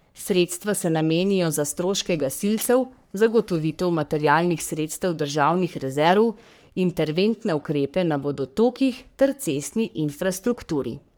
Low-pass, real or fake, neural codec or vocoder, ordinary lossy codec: none; fake; codec, 44.1 kHz, 3.4 kbps, Pupu-Codec; none